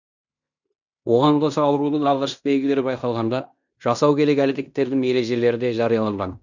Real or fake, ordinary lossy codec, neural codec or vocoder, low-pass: fake; none; codec, 16 kHz in and 24 kHz out, 0.9 kbps, LongCat-Audio-Codec, fine tuned four codebook decoder; 7.2 kHz